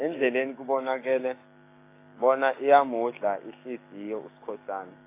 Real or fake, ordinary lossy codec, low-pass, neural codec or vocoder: fake; AAC, 24 kbps; 3.6 kHz; codec, 16 kHz, 6 kbps, DAC